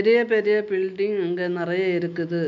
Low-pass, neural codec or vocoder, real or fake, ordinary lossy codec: 7.2 kHz; none; real; none